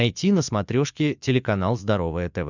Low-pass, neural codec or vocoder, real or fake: 7.2 kHz; vocoder, 44.1 kHz, 80 mel bands, Vocos; fake